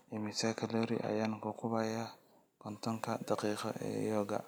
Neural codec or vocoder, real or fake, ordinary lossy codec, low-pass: none; real; none; none